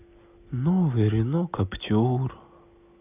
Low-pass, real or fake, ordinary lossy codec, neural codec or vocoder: 3.6 kHz; real; none; none